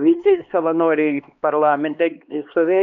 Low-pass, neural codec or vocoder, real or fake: 7.2 kHz; codec, 16 kHz, 2 kbps, X-Codec, HuBERT features, trained on LibriSpeech; fake